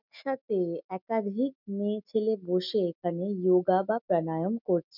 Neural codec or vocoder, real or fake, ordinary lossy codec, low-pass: none; real; none; 5.4 kHz